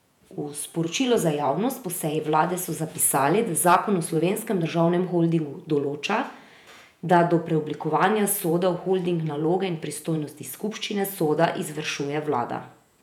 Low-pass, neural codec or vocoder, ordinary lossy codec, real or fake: 19.8 kHz; vocoder, 48 kHz, 128 mel bands, Vocos; none; fake